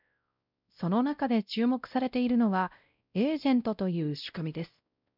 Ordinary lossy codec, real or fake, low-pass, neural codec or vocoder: none; fake; 5.4 kHz; codec, 16 kHz, 0.5 kbps, X-Codec, WavLM features, trained on Multilingual LibriSpeech